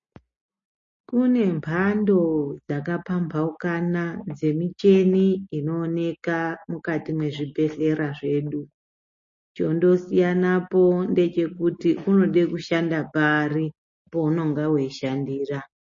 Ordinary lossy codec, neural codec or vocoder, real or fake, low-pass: MP3, 32 kbps; none; real; 7.2 kHz